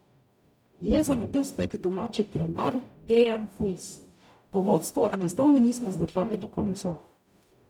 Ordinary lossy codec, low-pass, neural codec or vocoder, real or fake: none; 19.8 kHz; codec, 44.1 kHz, 0.9 kbps, DAC; fake